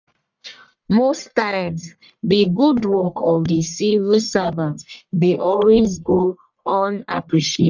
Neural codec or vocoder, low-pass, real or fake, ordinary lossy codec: codec, 44.1 kHz, 1.7 kbps, Pupu-Codec; 7.2 kHz; fake; none